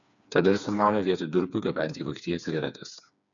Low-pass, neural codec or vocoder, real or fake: 7.2 kHz; codec, 16 kHz, 4 kbps, FreqCodec, smaller model; fake